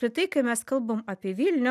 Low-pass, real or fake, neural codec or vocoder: 14.4 kHz; real; none